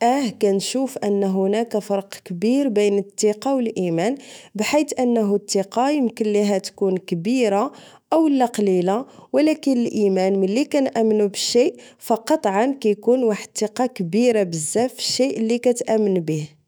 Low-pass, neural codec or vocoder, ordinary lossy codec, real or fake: none; autoencoder, 48 kHz, 128 numbers a frame, DAC-VAE, trained on Japanese speech; none; fake